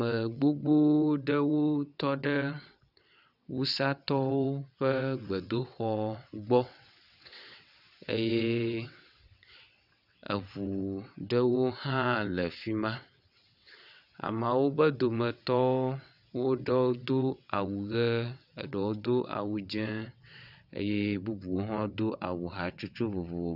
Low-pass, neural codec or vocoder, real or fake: 5.4 kHz; vocoder, 22.05 kHz, 80 mel bands, WaveNeXt; fake